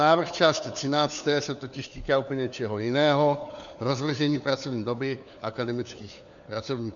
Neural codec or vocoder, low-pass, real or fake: codec, 16 kHz, 4 kbps, FunCodec, trained on LibriTTS, 50 frames a second; 7.2 kHz; fake